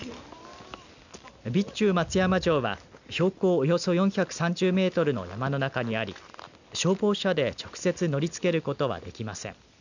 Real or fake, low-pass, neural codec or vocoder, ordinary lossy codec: real; 7.2 kHz; none; none